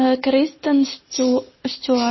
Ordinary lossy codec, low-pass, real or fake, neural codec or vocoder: MP3, 24 kbps; 7.2 kHz; real; none